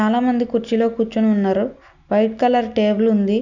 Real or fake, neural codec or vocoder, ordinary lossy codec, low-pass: real; none; none; 7.2 kHz